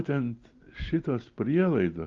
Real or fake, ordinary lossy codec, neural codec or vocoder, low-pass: real; Opus, 32 kbps; none; 7.2 kHz